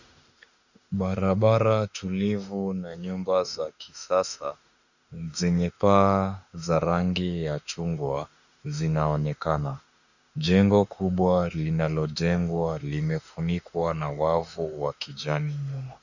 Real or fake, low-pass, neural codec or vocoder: fake; 7.2 kHz; autoencoder, 48 kHz, 32 numbers a frame, DAC-VAE, trained on Japanese speech